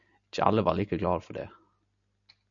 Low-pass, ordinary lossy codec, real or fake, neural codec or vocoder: 7.2 kHz; MP3, 96 kbps; real; none